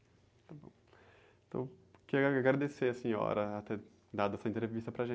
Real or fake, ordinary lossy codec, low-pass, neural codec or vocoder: real; none; none; none